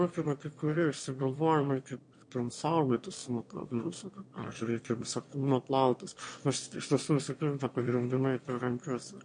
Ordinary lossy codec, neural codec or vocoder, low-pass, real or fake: MP3, 48 kbps; autoencoder, 22.05 kHz, a latent of 192 numbers a frame, VITS, trained on one speaker; 9.9 kHz; fake